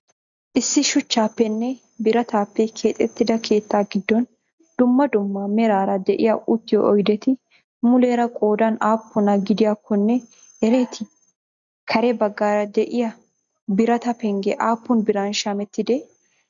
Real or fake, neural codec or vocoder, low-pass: real; none; 7.2 kHz